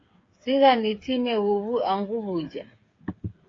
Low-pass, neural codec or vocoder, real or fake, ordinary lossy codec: 7.2 kHz; codec, 16 kHz, 8 kbps, FreqCodec, smaller model; fake; AAC, 32 kbps